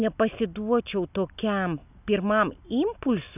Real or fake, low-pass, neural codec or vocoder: real; 3.6 kHz; none